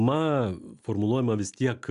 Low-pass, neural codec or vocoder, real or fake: 10.8 kHz; none; real